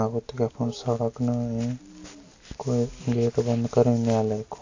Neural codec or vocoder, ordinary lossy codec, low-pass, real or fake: none; AAC, 48 kbps; 7.2 kHz; real